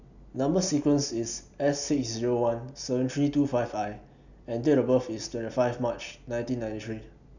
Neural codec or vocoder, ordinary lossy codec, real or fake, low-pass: none; none; real; 7.2 kHz